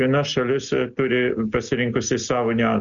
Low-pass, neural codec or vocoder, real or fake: 7.2 kHz; none; real